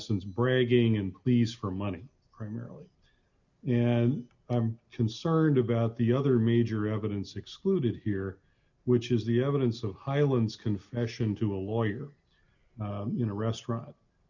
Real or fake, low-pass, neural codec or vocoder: real; 7.2 kHz; none